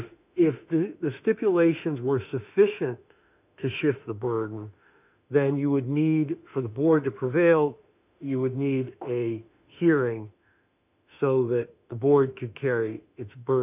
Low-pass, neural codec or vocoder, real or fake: 3.6 kHz; autoencoder, 48 kHz, 32 numbers a frame, DAC-VAE, trained on Japanese speech; fake